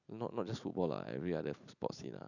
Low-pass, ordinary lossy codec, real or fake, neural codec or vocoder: 7.2 kHz; none; real; none